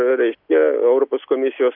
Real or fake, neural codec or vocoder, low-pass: real; none; 5.4 kHz